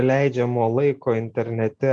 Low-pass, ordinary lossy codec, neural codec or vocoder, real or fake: 9.9 kHz; MP3, 96 kbps; none; real